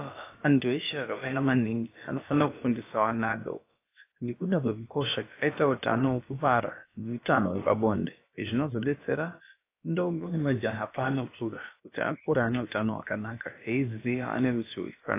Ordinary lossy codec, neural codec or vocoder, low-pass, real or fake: AAC, 24 kbps; codec, 16 kHz, about 1 kbps, DyCAST, with the encoder's durations; 3.6 kHz; fake